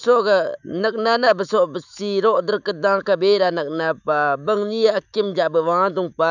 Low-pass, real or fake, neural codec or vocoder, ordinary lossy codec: 7.2 kHz; fake; autoencoder, 48 kHz, 128 numbers a frame, DAC-VAE, trained on Japanese speech; none